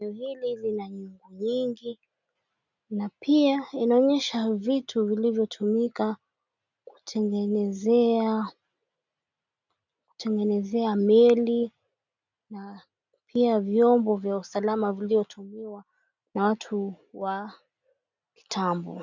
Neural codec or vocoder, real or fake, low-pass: none; real; 7.2 kHz